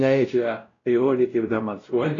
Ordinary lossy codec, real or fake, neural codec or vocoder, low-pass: AAC, 32 kbps; fake; codec, 16 kHz, 0.5 kbps, X-Codec, WavLM features, trained on Multilingual LibriSpeech; 7.2 kHz